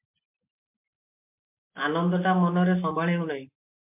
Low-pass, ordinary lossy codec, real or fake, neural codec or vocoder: 3.6 kHz; MP3, 32 kbps; real; none